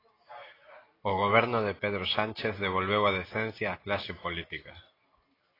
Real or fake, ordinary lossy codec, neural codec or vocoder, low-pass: real; AAC, 24 kbps; none; 5.4 kHz